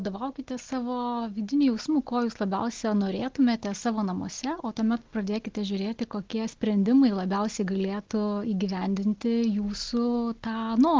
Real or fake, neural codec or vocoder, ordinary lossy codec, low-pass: real; none; Opus, 16 kbps; 7.2 kHz